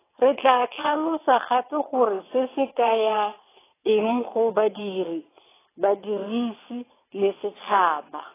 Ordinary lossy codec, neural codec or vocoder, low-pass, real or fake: AAC, 16 kbps; vocoder, 22.05 kHz, 80 mel bands, WaveNeXt; 3.6 kHz; fake